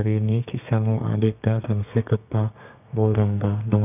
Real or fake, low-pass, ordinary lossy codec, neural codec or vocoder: fake; 3.6 kHz; none; codec, 32 kHz, 1.9 kbps, SNAC